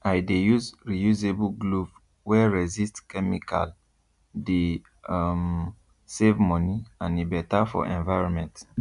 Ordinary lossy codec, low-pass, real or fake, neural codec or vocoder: none; 10.8 kHz; real; none